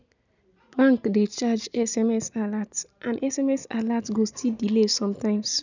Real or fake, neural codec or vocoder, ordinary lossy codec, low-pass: real; none; none; 7.2 kHz